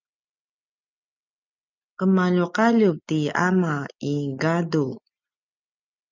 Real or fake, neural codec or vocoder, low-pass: real; none; 7.2 kHz